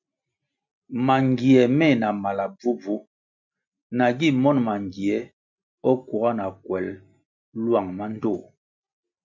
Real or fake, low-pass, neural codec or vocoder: real; 7.2 kHz; none